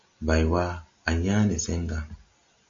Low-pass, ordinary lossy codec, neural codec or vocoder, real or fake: 7.2 kHz; AAC, 48 kbps; none; real